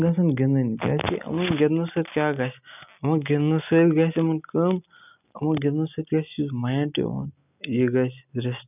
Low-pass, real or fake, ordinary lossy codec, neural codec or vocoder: 3.6 kHz; real; none; none